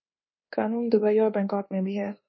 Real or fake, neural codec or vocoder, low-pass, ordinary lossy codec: fake; codec, 24 kHz, 1.2 kbps, DualCodec; 7.2 kHz; MP3, 24 kbps